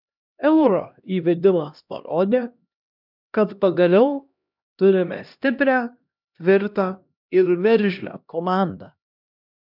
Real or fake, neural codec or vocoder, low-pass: fake; codec, 16 kHz, 1 kbps, X-Codec, HuBERT features, trained on LibriSpeech; 5.4 kHz